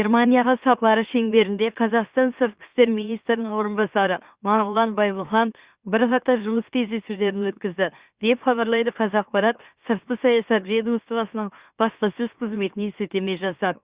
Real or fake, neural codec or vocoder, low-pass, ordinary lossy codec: fake; autoencoder, 44.1 kHz, a latent of 192 numbers a frame, MeloTTS; 3.6 kHz; Opus, 64 kbps